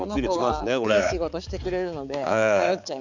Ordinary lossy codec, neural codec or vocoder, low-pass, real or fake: none; codec, 16 kHz, 4 kbps, X-Codec, HuBERT features, trained on balanced general audio; 7.2 kHz; fake